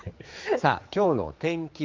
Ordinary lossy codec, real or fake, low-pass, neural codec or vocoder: Opus, 24 kbps; fake; 7.2 kHz; codec, 16 kHz, 4 kbps, X-Codec, HuBERT features, trained on general audio